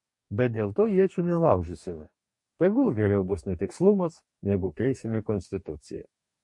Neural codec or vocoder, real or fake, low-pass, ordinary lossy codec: codec, 44.1 kHz, 2.6 kbps, DAC; fake; 10.8 kHz; MP3, 48 kbps